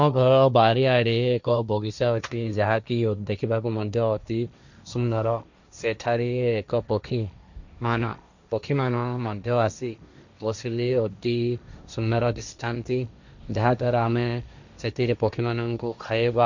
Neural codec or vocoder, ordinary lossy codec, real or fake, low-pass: codec, 16 kHz, 1.1 kbps, Voila-Tokenizer; none; fake; 7.2 kHz